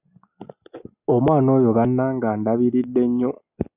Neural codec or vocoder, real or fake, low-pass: none; real; 3.6 kHz